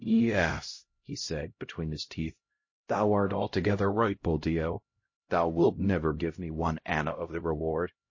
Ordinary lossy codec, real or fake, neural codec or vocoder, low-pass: MP3, 32 kbps; fake; codec, 16 kHz, 0.5 kbps, X-Codec, HuBERT features, trained on LibriSpeech; 7.2 kHz